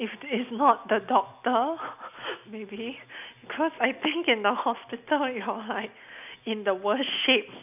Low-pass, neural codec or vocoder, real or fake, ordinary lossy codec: 3.6 kHz; none; real; none